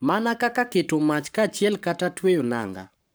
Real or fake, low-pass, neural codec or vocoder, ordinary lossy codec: fake; none; codec, 44.1 kHz, 7.8 kbps, Pupu-Codec; none